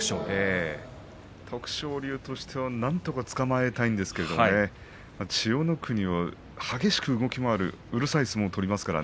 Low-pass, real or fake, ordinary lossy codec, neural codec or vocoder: none; real; none; none